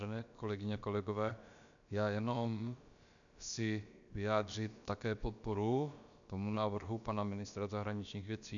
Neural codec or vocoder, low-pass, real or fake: codec, 16 kHz, about 1 kbps, DyCAST, with the encoder's durations; 7.2 kHz; fake